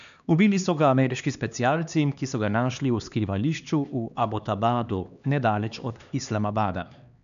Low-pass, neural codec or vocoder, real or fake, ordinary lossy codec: 7.2 kHz; codec, 16 kHz, 2 kbps, X-Codec, HuBERT features, trained on LibriSpeech; fake; none